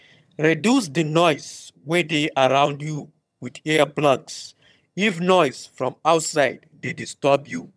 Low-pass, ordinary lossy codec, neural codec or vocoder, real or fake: none; none; vocoder, 22.05 kHz, 80 mel bands, HiFi-GAN; fake